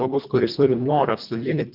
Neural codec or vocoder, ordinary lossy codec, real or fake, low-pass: codec, 24 kHz, 1.5 kbps, HILCodec; Opus, 32 kbps; fake; 5.4 kHz